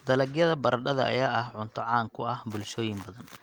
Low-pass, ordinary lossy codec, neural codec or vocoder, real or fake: 19.8 kHz; Opus, 32 kbps; none; real